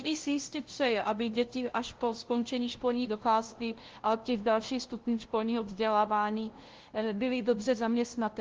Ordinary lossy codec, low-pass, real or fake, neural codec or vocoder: Opus, 16 kbps; 7.2 kHz; fake; codec, 16 kHz, 0.5 kbps, FunCodec, trained on LibriTTS, 25 frames a second